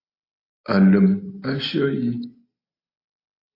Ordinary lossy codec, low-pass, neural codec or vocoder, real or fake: AAC, 32 kbps; 5.4 kHz; none; real